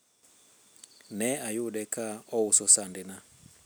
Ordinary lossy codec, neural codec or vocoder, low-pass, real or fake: none; none; none; real